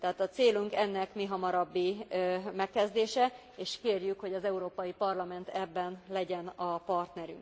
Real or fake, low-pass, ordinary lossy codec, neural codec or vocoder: real; none; none; none